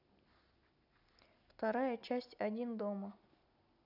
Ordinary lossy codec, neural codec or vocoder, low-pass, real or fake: none; none; 5.4 kHz; real